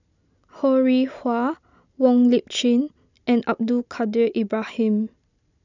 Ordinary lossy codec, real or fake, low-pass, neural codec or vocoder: none; real; 7.2 kHz; none